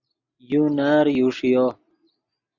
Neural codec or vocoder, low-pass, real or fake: none; 7.2 kHz; real